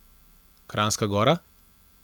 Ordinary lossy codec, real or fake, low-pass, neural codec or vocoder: none; real; none; none